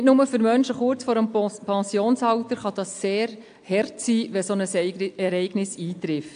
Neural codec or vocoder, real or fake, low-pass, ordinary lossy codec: none; real; 9.9 kHz; AAC, 64 kbps